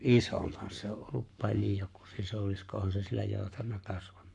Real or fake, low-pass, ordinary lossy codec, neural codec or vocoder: fake; 10.8 kHz; MP3, 64 kbps; codec, 44.1 kHz, 7.8 kbps, Pupu-Codec